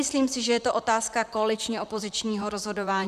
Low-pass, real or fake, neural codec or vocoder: 14.4 kHz; fake; vocoder, 44.1 kHz, 128 mel bands, Pupu-Vocoder